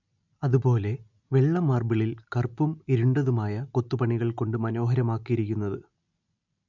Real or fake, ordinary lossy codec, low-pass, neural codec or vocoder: real; none; 7.2 kHz; none